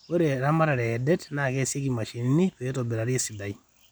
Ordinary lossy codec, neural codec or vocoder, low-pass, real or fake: none; none; none; real